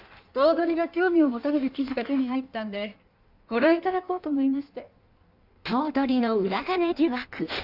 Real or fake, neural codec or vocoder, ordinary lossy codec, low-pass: fake; codec, 16 kHz in and 24 kHz out, 1.1 kbps, FireRedTTS-2 codec; none; 5.4 kHz